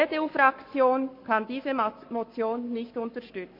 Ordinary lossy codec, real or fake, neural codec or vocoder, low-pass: MP3, 32 kbps; fake; codec, 44.1 kHz, 7.8 kbps, Pupu-Codec; 5.4 kHz